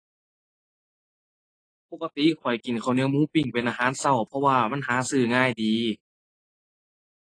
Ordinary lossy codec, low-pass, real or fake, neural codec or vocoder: AAC, 32 kbps; 9.9 kHz; real; none